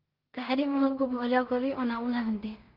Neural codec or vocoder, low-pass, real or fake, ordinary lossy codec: codec, 16 kHz in and 24 kHz out, 0.4 kbps, LongCat-Audio-Codec, two codebook decoder; 5.4 kHz; fake; Opus, 24 kbps